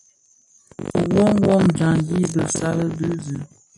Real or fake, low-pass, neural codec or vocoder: real; 10.8 kHz; none